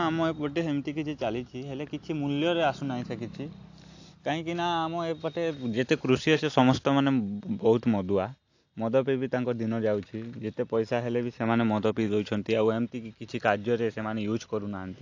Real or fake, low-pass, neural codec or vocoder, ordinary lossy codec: real; 7.2 kHz; none; AAC, 48 kbps